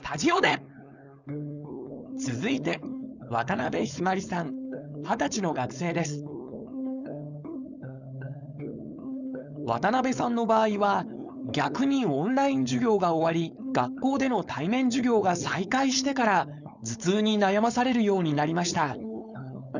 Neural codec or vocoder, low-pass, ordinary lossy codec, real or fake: codec, 16 kHz, 4.8 kbps, FACodec; 7.2 kHz; none; fake